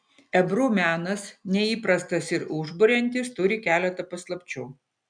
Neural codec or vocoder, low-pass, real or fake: none; 9.9 kHz; real